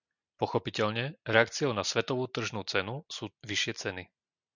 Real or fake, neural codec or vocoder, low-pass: real; none; 7.2 kHz